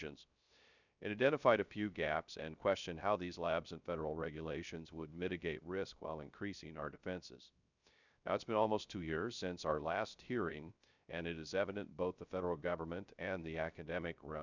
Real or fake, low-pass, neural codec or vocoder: fake; 7.2 kHz; codec, 16 kHz, 0.3 kbps, FocalCodec